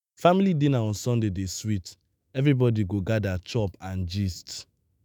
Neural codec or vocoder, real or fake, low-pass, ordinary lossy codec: autoencoder, 48 kHz, 128 numbers a frame, DAC-VAE, trained on Japanese speech; fake; none; none